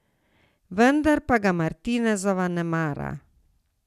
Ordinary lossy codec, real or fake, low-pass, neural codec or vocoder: none; real; 14.4 kHz; none